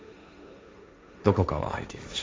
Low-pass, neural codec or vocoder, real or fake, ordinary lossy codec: none; codec, 16 kHz, 1.1 kbps, Voila-Tokenizer; fake; none